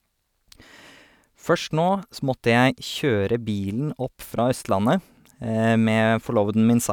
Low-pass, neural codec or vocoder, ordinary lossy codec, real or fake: 19.8 kHz; none; none; real